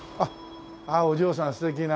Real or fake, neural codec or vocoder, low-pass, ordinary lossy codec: real; none; none; none